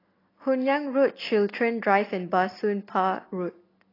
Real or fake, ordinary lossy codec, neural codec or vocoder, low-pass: real; AAC, 24 kbps; none; 5.4 kHz